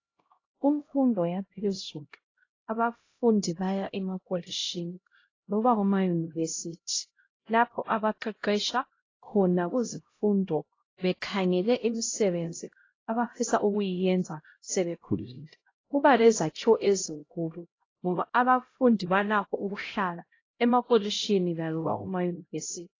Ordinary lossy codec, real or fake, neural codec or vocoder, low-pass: AAC, 32 kbps; fake; codec, 16 kHz, 0.5 kbps, X-Codec, HuBERT features, trained on LibriSpeech; 7.2 kHz